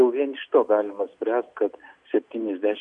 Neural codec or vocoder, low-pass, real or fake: none; 9.9 kHz; real